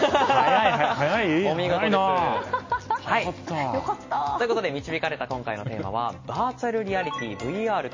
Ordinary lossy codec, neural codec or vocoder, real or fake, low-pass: MP3, 32 kbps; none; real; 7.2 kHz